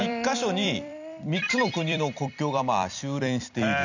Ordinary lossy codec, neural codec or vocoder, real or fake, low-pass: none; vocoder, 44.1 kHz, 128 mel bands every 256 samples, BigVGAN v2; fake; 7.2 kHz